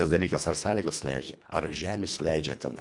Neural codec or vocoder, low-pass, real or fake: codec, 24 kHz, 1.5 kbps, HILCodec; 10.8 kHz; fake